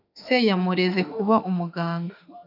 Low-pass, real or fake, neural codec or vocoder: 5.4 kHz; fake; autoencoder, 48 kHz, 32 numbers a frame, DAC-VAE, trained on Japanese speech